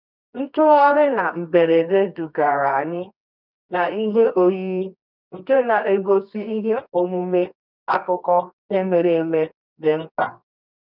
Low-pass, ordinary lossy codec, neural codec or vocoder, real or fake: 5.4 kHz; none; codec, 24 kHz, 0.9 kbps, WavTokenizer, medium music audio release; fake